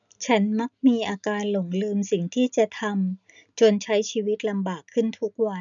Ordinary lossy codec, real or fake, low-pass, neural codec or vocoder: none; real; 7.2 kHz; none